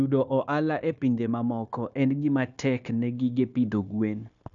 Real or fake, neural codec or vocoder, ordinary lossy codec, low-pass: fake; codec, 16 kHz, 0.9 kbps, LongCat-Audio-Codec; none; 7.2 kHz